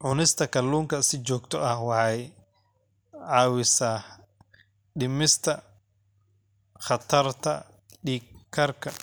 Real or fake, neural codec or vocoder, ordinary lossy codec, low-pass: real; none; none; none